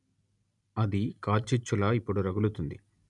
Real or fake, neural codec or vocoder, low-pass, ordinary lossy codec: real; none; 10.8 kHz; none